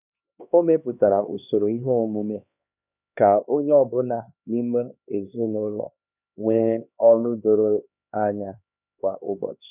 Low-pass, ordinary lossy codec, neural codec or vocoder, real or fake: 3.6 kHz; none; codec, 16 kHz, 2 kbps, X-Codec, HuBERT features, trained on LibriSpeech; fake